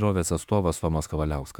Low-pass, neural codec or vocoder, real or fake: 19.8 kHz; autoencoder, 48 kHz, 32 numbers a frame, DAC-VAE, trained on Japanese speech; fake